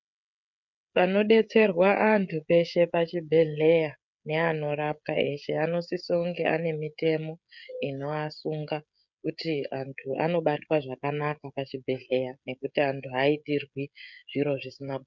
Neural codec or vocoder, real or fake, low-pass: codec, 16 kHz, 16 kbps, FreqCodec, smaller model; fake; 7.2 kHz